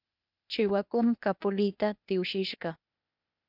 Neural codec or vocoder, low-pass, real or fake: codec, 16 kHz, 0.8 kbps, ZipCodec; 5.4 kHz; fake